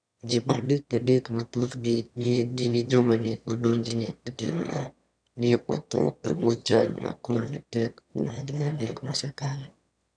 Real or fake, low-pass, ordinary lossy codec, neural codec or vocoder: fake; 9.9 kHz; none; autoencoder, 22.05 kHz, a latent of 192 numbers a frame, VITS, trained on one speaker